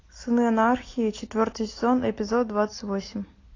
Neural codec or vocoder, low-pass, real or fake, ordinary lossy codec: none; 7.2 kHz; real; AAC, 32 kbps